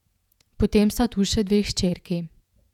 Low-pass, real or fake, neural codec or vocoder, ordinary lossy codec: 19.8 kHz; fake; vocoder, 48 kHz, 128 mel bands, Vocos; none